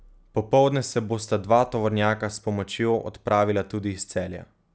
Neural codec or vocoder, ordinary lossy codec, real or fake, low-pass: none; none; real; none